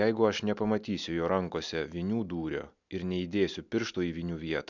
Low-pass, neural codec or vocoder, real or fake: 7.2 kHz; none; real